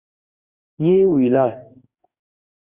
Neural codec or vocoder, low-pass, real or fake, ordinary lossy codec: codec, 44.1 kHz, 2.6 kbps, DAC; 3.6 kHz; fake; Opus, 64 kbps